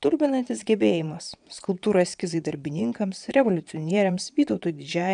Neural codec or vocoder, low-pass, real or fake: vocoder, 22.05 kHz, 80 mel bands, Vocos; 9.9 kHz; fake